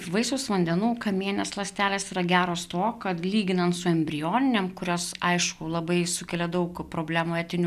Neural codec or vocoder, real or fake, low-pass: none; real; 14.4 kHz